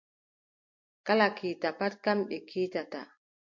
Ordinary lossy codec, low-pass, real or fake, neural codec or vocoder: MP3, 48 kbps; 7.2 kHz; real; none